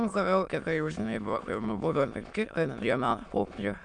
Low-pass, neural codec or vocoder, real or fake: 9.9 kHz; autoencoder, 22.05 kHz, a latent of 192 numbers a frame, VITS, trained on many speakers; fake